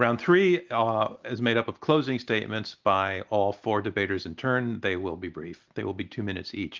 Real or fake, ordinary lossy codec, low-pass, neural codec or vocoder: real; Opus, 32 kbps; 7.2 kHz; none